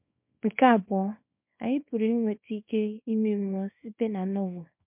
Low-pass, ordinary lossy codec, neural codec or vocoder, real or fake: 3.6 kHz; MP3, 32 kbps; codec, 24 kHz, 0.9 kbps, WavTokenizer, small release; fake